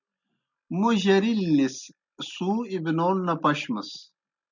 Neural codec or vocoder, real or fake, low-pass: none; real; 7.2 kHz